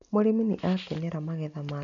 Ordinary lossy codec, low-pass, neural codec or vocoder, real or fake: none; 7.2 kHz; none; real